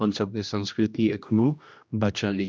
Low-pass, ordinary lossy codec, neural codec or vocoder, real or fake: none; none; codec, 16 kHz, 1 kbps, X-Codec, HuBERT features, trained on general audio; fake